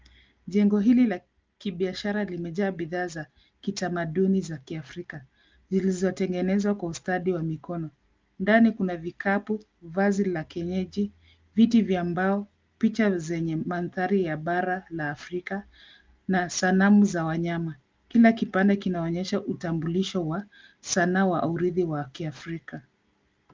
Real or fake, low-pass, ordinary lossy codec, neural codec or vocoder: real; 7.2 kHz; Opus, 24 kbps; none